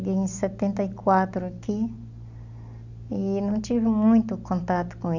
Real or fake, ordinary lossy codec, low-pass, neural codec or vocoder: real; none; 7.2 kHz; none